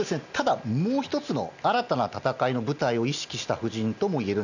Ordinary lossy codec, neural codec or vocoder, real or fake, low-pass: none; none; real; 7.2 kHz